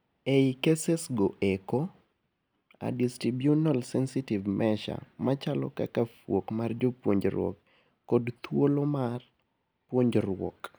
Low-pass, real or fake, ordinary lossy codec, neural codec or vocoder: none; real; none; none